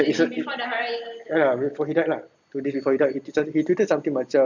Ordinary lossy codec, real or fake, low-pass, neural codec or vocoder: none; real; 7.2 kHz; none